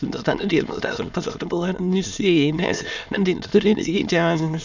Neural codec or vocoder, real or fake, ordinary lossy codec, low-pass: autoencoder, 22.05 kHz, a latent of 192 numbers a frame, VITS, trained on many speakers; fake; none; 7.2 kHz